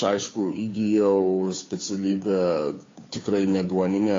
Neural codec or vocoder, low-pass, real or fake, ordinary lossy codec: codec, 16 kHz, 2 kbps, FunCodec, trained on Chinese and English, 25 frames a second; 7.2 kHz; fake; AAC, 32 kbps